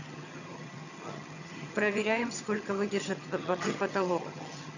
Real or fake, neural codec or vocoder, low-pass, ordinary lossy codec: fake; vocoder, 22.05 kHz, 80 mel bands, HiFi-GAN; 7.2 kHz; none